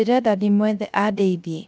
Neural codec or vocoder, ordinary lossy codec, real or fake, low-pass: codec, 16 kHz, 0.3 kbps, FocalCodec; none; fake; none